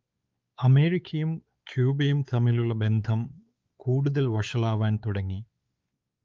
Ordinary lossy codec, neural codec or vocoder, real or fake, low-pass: Opus, 32 kbps; codec, 16 kHz, 4 kbps, X-Codec, WavLM features, trained on Multilingual LibriSpeech; fake; 7.2 kHz